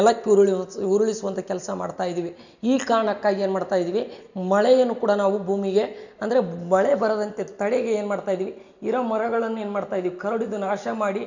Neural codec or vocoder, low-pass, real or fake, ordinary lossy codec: none; 7.2 kHz; real; none